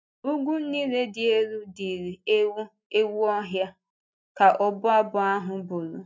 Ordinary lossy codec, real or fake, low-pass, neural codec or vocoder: none; real; none; none